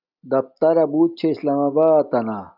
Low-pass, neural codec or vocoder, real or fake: 5.4 kHz; none; real